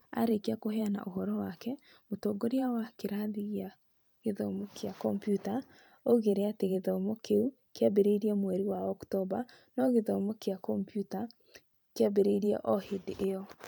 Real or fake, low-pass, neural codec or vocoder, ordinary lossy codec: fake; none; vocoder, 44.1 kHz, 128 mel bands every 512 samples, BigVGAN v2; none